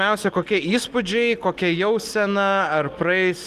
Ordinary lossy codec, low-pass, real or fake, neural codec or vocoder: Opus, 24 kbps; 14.4 kHz; real; none